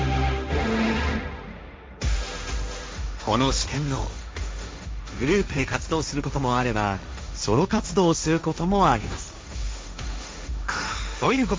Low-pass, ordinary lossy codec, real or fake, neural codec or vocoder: none; none; fake; codec, 16 kHz, 1.1 kbps, Voila-Tokenizer